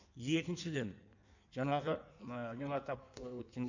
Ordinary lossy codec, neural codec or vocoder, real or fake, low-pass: none; codec, 16 kHz in and 24 kHz out, 1.1 kbps, FireRedTTS-2 codec; fake; 7.2 kHz